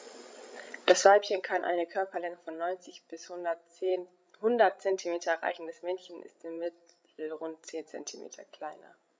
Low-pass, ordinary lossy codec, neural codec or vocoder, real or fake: none; none; codec, 16 kHz, 16 kbps, FreqCodec, larger model; fake